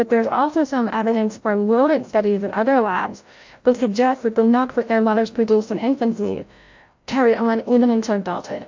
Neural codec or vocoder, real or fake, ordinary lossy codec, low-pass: codec, 16 kHz, 0.5 kbps, FreqCodec, larger model; fake; MP3, 48 kbps; 7.2 kHz